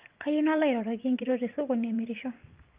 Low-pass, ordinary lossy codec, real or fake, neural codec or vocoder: 3.6 kHz; Opus, 32 kbps; fake; vocoder, 24 kHz, 100 mel bands, Vocos